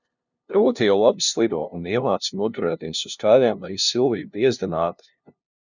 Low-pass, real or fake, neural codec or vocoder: 7.2 kHz; fake; codec, 16 kHz, 0.5 kbps, FunCodec, trained on LibriTTS, 25 frames a second